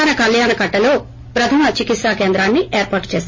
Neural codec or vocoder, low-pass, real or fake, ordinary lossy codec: none; 7.2 kHz; real; MP3, 32 kbps